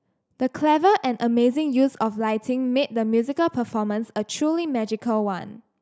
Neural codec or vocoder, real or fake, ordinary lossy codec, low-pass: none; real; none; none